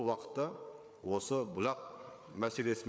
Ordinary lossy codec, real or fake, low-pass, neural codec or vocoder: none; fake; none; codec, 16 kHz, 8 kbps, FreqCodec, larger model